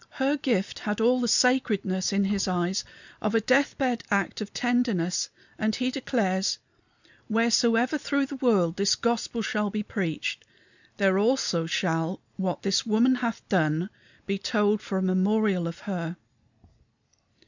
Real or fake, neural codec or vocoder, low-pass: real; none; 7.2 kHz